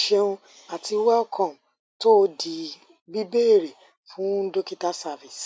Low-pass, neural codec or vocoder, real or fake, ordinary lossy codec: none; none; real; none